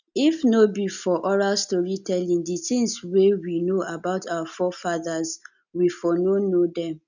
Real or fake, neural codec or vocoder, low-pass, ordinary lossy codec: real; none; 7.2 kHz; none